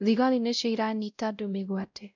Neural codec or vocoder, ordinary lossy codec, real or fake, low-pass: codec, 16 kHz, 0.5 kbps, X-Codec, WavLM features, trained on Multilingual LibriSpeech; none; fake; 7.2 kHz